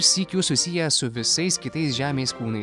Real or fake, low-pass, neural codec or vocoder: real; 10.8 kHz; none